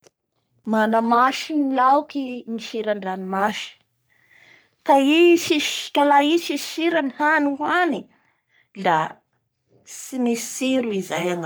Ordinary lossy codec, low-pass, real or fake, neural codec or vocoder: none; none; fake; codec, 44.1 kHz, 3.4 kbps, Pupu-Codec